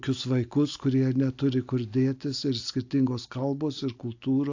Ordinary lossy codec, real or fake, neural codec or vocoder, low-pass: AAC, 48 kbps; real; none; 7.2 kHz